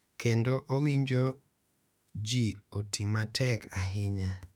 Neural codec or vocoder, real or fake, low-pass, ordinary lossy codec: autoencoder, 48 kHz, 32 numbers a frame, DAC-VAE, trained on Japanese speech; fake; 19.8 kHz; none